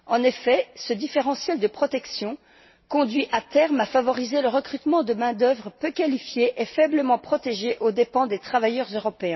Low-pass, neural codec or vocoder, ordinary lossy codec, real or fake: 7.2 kHz; none; MP3, 24 kbps; real